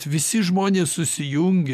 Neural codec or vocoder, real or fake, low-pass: none; real; 14.4 kHz